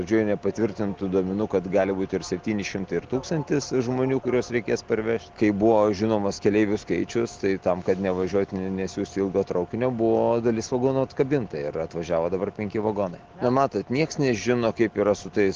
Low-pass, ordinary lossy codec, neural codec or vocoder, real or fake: 7.2 kHz; Opus, 16 kbps; none; real